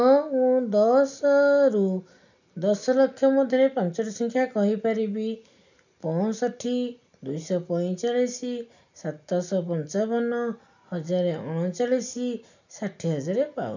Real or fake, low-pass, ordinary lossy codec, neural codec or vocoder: real; 7.2 kHz; none; none